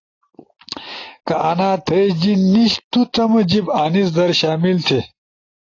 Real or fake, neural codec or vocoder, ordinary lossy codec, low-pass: real; none; AAC, 32 kbps; 7.2 kHz